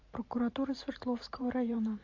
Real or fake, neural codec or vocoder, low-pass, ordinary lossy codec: fake; vocoder, 44.1 kHz, 128 mel bands every 512 samples, BigVGAN v2; 7.2 kHz; AAC, 48 kbps